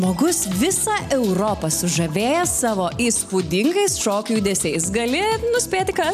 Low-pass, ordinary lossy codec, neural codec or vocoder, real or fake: 14.4 kHz; AAC, 96 kbps; none; real